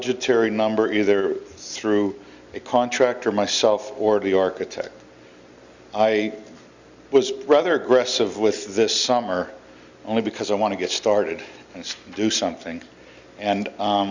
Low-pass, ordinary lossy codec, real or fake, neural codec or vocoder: 7.2 kHz; Opus, 64 kbps; real; none